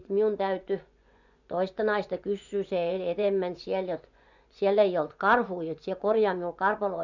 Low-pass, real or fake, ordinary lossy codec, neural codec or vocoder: 7.2 kHz; fake; none; vocoder, 24 kHz, 100 mel bands, Vocos